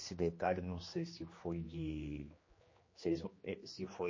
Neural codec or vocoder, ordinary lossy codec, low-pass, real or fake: codec, 16 kHz, 2 kbps, X-Codec, HuBERT features, trained on general audio; MP3, 32 kbps; 7.2 kHz; fake